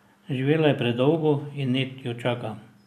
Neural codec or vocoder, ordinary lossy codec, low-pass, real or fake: none; none; 14.4 kHz; real